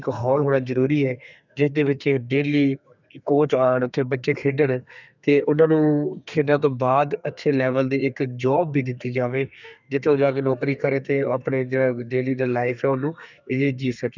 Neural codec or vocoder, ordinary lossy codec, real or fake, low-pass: codec, 32 kHz, 1.9 kbps, SNAC; none; fake; 7.2 kHz